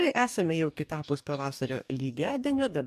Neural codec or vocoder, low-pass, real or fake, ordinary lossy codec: codec, 44.1 kHz, 2.6 kbps, DAC; 14.4 kHz; fake; AAC, 96 kbps